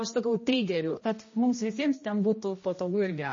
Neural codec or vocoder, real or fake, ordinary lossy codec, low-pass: codec, 16 kHz, 1 kbps, X-Codec, HuBERT features, trained on general audio; fake; MP3, 32 kbps; 7.2 kHz